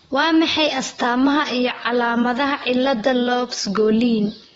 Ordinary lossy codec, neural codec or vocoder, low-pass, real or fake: AAC, 24 kbps; vocoder, 44.1 kHz, 128 mel bands, Pupu-Vocoder; 19.8 kHz; fake